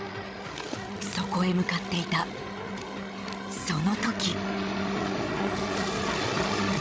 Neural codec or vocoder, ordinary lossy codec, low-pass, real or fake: codec, 16 kHz, 16 kbps, FreqCodec, larger model; none; none; fake